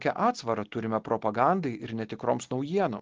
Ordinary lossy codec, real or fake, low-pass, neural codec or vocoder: Opus, 16 kbps; real; 7.2 kHz; none